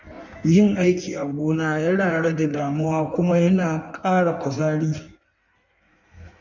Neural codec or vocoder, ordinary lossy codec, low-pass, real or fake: codec, 16 kHz in and 24 kHz out, 1.1 kbps, FireRedTTS-2 codec; none; 7.2 kHz; fake